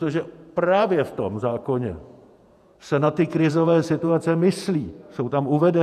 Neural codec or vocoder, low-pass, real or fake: vocoder, 48 kHz, 128 mel bands, Vocos; 14.4 kHz; fake